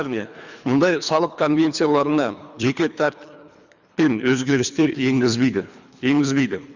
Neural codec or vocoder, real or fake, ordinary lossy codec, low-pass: codec, 24 kHz, 3 kbps, HILCodec; fake; Opus, 64 kbps; 7.2 kHz